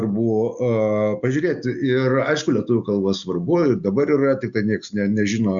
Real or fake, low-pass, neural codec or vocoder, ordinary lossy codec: real; 7.2 kHz; none; Opus, 64 kbps